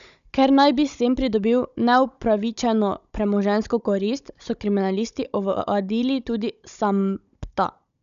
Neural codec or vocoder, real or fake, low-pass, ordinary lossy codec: codec, 16 kHz, 16 kbps, FunCodec, trained on Chinese and English, 50 frames a second; fake; 7.2 kHz; none